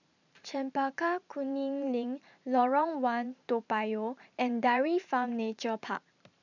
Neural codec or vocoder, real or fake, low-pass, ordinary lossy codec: vocoder, 44.1 kHz, 128 mel bands every 512 samples, BigVGAN v2; fake; 7.2 kHz; none